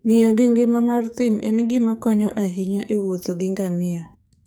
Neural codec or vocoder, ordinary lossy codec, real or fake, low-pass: codec, 44.1 kHz, 2.6 kbps, SNAC; none; fake; none